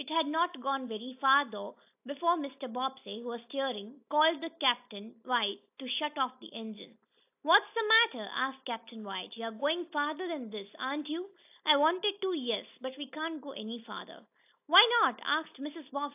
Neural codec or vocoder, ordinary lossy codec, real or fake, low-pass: none; AAC, 32 kbps; real; 3.6 kHz